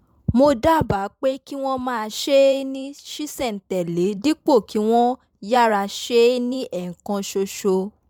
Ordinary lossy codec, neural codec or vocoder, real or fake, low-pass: MP3, 96 kbps; vocoder, 44.1 kHz, 128 mel bands every 512 samples, BigVGAN v2; fake; 19.8 kHz